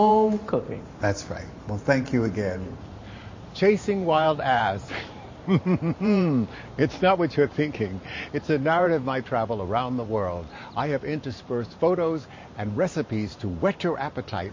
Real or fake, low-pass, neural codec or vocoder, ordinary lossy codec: fake; 7.2 kHz; vocoder, 44.1 kHz, 128 mel bands every 512 samples, BigVGAN v2; MP3, 32 kbps